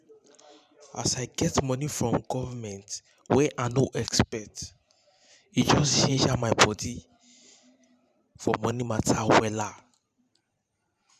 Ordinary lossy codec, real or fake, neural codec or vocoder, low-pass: none; fake; vocoder, 44.1 kHz, 128 mel bands every 512 samples, BigVGAN v2; 14.4 kHz